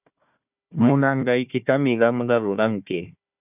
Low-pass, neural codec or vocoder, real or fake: 3.6 kHz; codec, 16 kHz, 1 kbps, FunCodec, trained on Chinese and English, 50 frames a second; fake